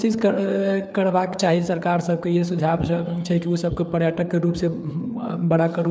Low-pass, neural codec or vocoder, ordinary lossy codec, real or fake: none; codec, 16 kHz, 4 kbps, FreqCodec, larger model; none; fake